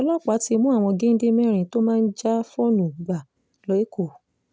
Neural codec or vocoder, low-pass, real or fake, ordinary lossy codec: none; none; real; none